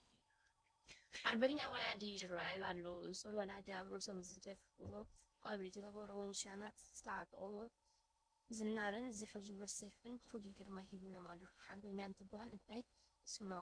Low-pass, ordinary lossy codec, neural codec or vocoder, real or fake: 9.9 kHz; MP3, 64 kbps; codec, 16 kHz in and 24 kHz out, 0.6 kbps, FocalCodec, streaming, 4096 codes; fake